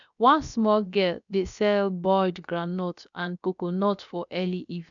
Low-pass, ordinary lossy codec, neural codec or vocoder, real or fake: 7.2 kHz; none; codec, 16 kHz, about 1 kbps, DyCAST, with the encoder's durations; fake